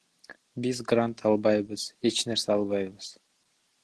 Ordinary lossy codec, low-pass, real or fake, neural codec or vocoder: Opus, 16 kbps; 10.8 kHz; real; none